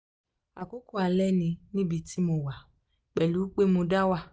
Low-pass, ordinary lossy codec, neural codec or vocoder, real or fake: none; none; none; real